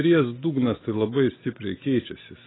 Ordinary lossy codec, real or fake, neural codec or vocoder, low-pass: AAC, 16 kbps; real; none; 7.2 kHz